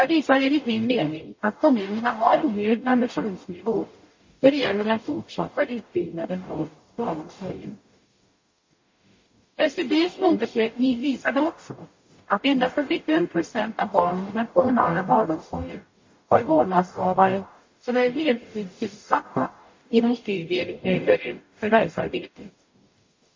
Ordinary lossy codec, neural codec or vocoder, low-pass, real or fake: MP3, 32 kbps; codec, 44.1 kHz, 0.9 kbps, DAC; 7.2 kHz; fake